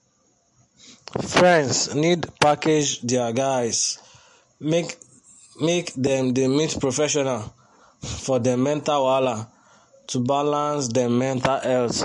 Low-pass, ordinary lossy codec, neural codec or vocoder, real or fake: 14.4 kHz; MP3, 48 kbps; none; real